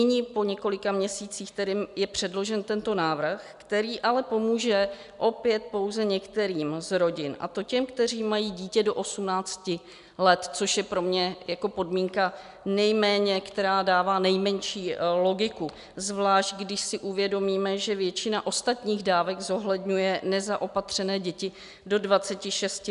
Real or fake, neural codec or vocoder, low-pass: real; none; 10.8 kHz